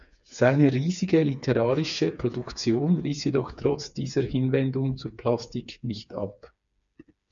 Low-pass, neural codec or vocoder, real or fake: 7.2 kHz; codec, 16 kHz, 4 kbps, FreqCodec, smaller model; fake